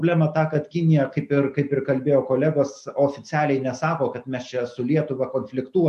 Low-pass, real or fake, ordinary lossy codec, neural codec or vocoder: 14.4 kHz; real; MP3, 64 kbps; none